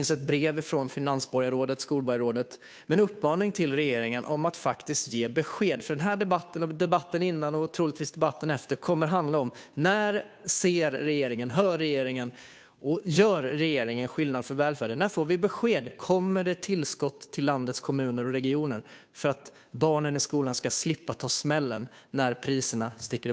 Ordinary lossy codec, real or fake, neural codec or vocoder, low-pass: none; fake; codec, 16 kHz, 2 kbps, FunCodec, trained on Chinese and English, 25 frames a second; none